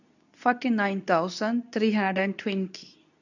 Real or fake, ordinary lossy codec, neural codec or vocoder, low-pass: fake; none; codec, 24 kHz, 0.9 kbps, WavTokenizer, medium speech release version 2; 7.2 kHz